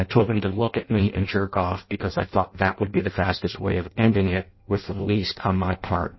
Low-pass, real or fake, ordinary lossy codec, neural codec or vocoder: 7.2 kHz; fake; MP3, 24 kbps; codec, 16 kHz in and 24 kHz out, 0.6 kbps, FireRedTTS-2 codec